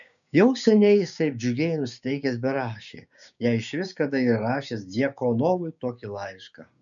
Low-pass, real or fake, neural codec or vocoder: 7.2 kHz; fake; codec, 16 kHz, 6 kbps, DAC